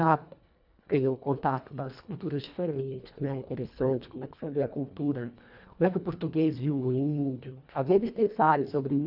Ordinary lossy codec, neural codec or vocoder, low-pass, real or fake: none; codec, 24 kHz, 1.5 kbps, HILCodec; 5.4 kHz; fake